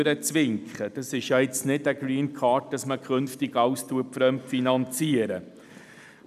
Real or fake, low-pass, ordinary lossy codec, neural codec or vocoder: real; 14.4 kHz; none; none